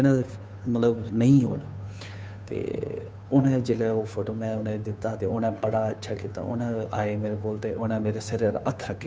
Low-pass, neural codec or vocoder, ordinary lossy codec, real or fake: none; codec, 16 kHz, 2 kbps, FunCodec, trained on Chinese and English, 25 frames a second; none; fake